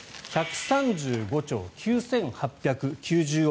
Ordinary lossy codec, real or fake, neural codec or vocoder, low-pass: none; real; none; none